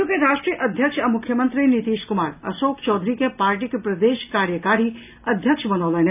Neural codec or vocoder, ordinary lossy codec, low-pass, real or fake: none; none; 3.6 kHz; real